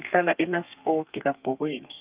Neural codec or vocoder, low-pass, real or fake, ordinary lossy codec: codec, 32 kHz, 1.9 kbps, SNAC; 3.6 kHz; fake; Opus, 24 kbps